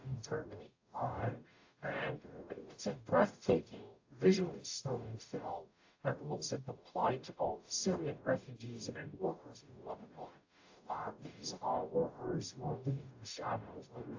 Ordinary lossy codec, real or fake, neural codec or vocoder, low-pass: AAC, 48 kbps; fake; codec, 44.1 kHz, 0.9 kbps, DAC; 7.2 kHz